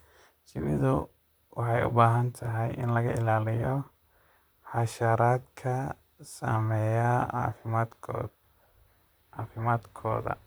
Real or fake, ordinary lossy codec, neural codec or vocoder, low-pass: fake; none; vocoder, 44.1 kHz, 128 mel bands, Pupu-Vocoder; none